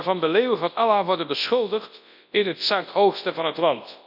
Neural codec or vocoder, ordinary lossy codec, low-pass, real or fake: codec, 24 kHz, 0.9 kbps, WavTokenizer, large speech release; none; 5.4 kHz; fake